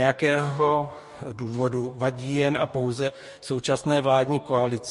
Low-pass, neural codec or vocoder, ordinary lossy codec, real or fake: 14.4 kHz; codec, 44.1 kHz, 2.6 kbps, DAC; MP3, 48 kbps; fake